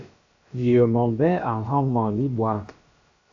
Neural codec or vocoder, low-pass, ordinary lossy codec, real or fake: codec, 16 kHz, about 1 kbps, DyCAST, with the encoder's durations; 7.2 kHz; AAC, 64 kbps; fake